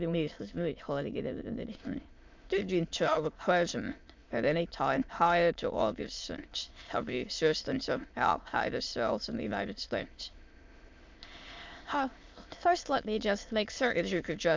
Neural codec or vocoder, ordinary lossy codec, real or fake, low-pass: autoencoder, 22.05 kHz, a latent of 192 numbers a frame, VITS, trained on many speakers; MP3, 64 kbps; fake; 7.2 kHz